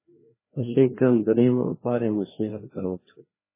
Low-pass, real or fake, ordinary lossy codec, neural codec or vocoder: 3.6 kHz; fake; MP3, 16 kbps; codec, 16 kHz, 1 kbps, FreqCodec, larger model